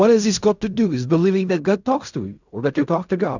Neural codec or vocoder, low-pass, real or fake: codec, 16 kHz in and 24 kHz out, 0.4 kbps, LongCat-Audio-Codec, fine tuned four codebook decoder; 7.2 kHz; fake